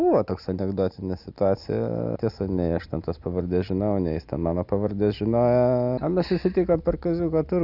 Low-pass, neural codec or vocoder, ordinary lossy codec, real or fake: 5.4 kHz; none; Opus, 64 kbps; real